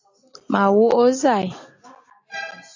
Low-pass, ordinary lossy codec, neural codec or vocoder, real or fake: 7.2 kHz; AAC, 48 kbps; none; real